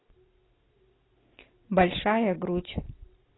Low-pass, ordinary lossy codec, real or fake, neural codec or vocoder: 7.2 kHz; AAC, 16 kbps; real; none